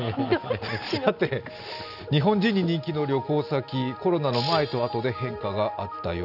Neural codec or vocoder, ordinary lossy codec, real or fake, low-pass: none; none; real; 5.4 kHz